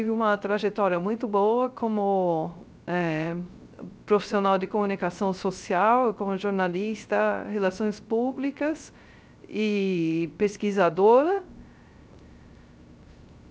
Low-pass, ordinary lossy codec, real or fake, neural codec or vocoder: none; none; fake; codec, 16 kHz, 0.3 kbps, FocalCodec